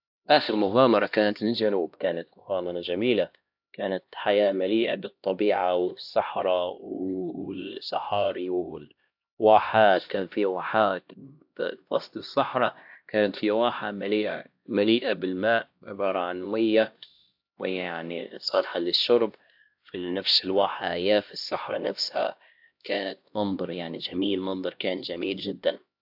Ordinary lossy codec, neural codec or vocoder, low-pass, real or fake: AAC, 48 kbps; codec, 16 kHz, 1 kbps, X-Codec, HuBERT features, trained on LibriSpeech; 5.4 kHz; fake